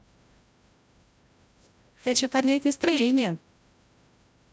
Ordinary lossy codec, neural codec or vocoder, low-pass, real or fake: none; codec, 16 kHz, 0.5 kbps, FreqCodec, larger model; none; fake